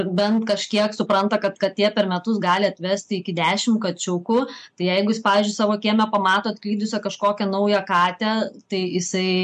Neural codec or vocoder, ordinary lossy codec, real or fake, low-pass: none; MP3, 64 kbps; real; 9.9 kHz